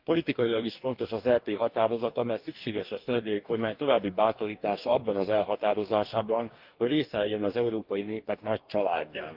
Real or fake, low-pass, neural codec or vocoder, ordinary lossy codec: fake; 5.4 kHz; codec, 44.1 kHz, 2.6 kbps, DAC; Opus, 24 kbps